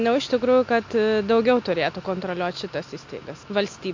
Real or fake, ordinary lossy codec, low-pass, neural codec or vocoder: real; MP3, 48 kbps; 7.2 kHz; none